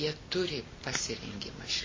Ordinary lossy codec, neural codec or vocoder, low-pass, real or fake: MP3, 32 kbps; none; 7.2 kHz; real